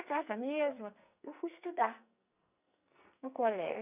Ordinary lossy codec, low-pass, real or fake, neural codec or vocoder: none; 3.6 kHz; fake; codec, 44.1 kHz, 2.6 kbps, SNAC